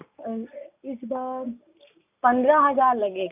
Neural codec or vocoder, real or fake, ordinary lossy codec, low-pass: none; real; none; 3.6 kHz